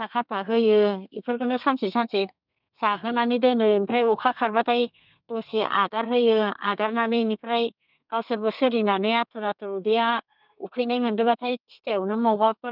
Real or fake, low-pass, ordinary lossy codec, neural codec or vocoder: fake; 5.4 kHz; none; codec, 32 kHz, 1.9 kbps, SNAC